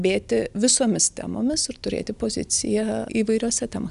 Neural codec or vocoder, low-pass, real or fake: none; 10.8 kHz; real